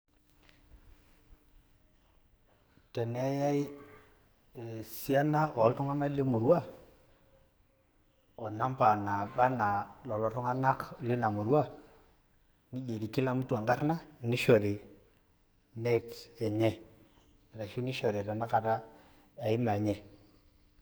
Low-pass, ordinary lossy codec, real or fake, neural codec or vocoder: none; none; fake; codec, 44.1 kHz, 2.6 kbps, SNAC